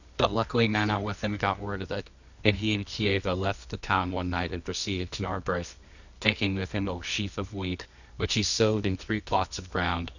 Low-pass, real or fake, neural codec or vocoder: 7.2 kHz; fake; codec, 24 kHz, 0.9 kbps, WavTokenizer, medium music audio release